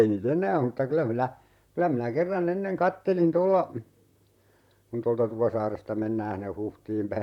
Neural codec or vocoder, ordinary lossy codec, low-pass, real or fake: vocoder, 44.1 kHz, 128 mel bands, Pupu-Vocoder; none; 19.8 kHz; fake